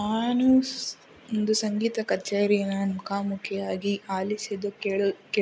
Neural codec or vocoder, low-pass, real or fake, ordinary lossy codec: none; none; real; none